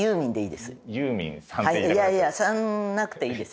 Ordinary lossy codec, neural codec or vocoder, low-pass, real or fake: none; none; none; real